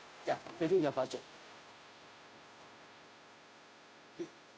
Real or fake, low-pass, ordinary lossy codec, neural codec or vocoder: fake; none; none; codec, 16 kHz, 0.5 kbps, FunCodec, trained on Chinese and English, 25 frames a second